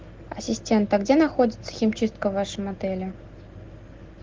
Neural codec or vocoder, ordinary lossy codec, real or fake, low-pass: none; Opus, 16 kbps; real; 7.2 kHz